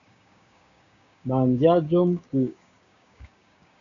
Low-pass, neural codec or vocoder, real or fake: 7.2 kHz; codec, 16 kHz, 6 kbps, DAC; fake